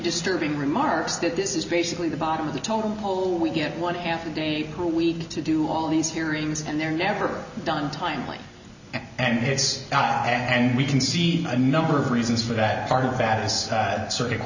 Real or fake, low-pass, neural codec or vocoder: real; 7.2 kHz; none